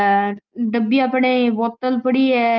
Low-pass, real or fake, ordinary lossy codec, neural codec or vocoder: 7.2 kHz; real; Opus, 24 kbps; none